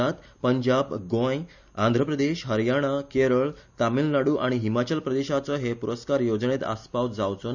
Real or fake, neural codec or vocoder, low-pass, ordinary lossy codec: real; none; 7.2 kHz; none